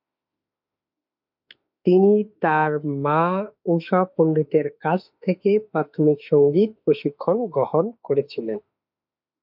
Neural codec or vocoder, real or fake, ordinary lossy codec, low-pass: autoencoder, 48 kHz, 32 numbers a frame, DAC-VAE, trained on Japanese speech; fake; MP3, 48 kbps; 5.4 kHz